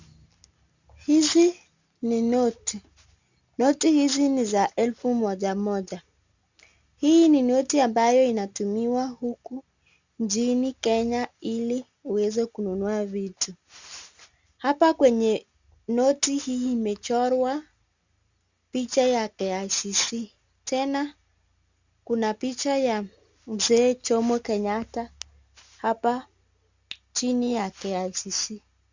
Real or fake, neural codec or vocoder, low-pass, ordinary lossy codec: real; none; 7.2 kHz; Opus, 64 kbps